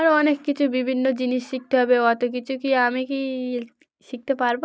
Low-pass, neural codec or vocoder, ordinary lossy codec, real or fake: none; none; none; real